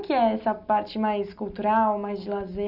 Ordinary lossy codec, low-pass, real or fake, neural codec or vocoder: none; 5.4 kHz; real; none